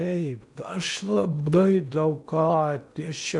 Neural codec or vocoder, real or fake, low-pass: codec, 16 kHz in and 24 kHz out, 0.8 kbps, FocalCodec, streaming, 65536 codes; fake; 10.8 kHz